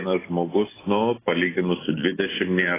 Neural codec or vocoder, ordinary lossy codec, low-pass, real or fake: none; AAC, 16 kbps; 3.6 kHz; real